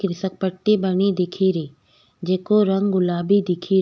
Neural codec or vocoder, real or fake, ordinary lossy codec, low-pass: none; real; none; none